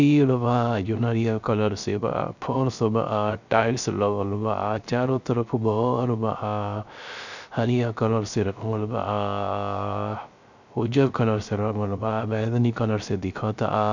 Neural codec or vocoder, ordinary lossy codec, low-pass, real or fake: codec, 16 kHz, 0.3 kbps, FocalCodec; none; 7.2 kHz; fake